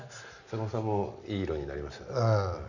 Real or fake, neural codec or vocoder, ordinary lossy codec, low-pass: real; none; none; 7.2 kHz